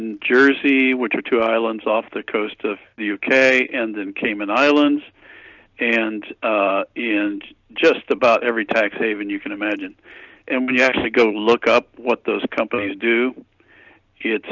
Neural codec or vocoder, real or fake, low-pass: none; real; 7.2 kHz